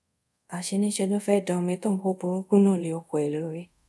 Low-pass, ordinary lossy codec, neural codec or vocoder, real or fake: none; none; codec, 24 kHz, 0.5 kbps, DualCodec; fake